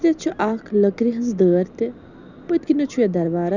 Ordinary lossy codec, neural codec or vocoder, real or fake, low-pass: none; none; real; 7.2 kHz